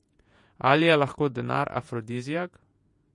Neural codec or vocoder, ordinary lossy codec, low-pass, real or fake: vocoder, 48 kHz, 128 mel bands, Vocos; MP3, 48 kbps; 10.8 kHz; fake